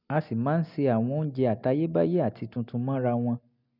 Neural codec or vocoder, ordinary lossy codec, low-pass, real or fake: none; none; 5.4 kHz; real